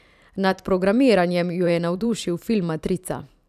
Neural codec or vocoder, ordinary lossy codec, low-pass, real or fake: vocoder, 44.1 kHz, 128 mel bands every 512 samples, BigVGAN v2; none; 14.4 kHz; fake